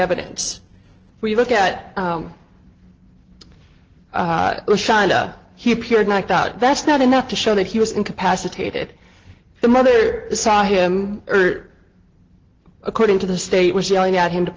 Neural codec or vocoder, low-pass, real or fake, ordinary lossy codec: none; 7.2 kHz; real; Opus, 16 kbps